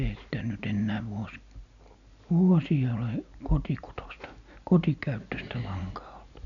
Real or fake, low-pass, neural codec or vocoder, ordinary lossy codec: real; 7.2 kHz; none; none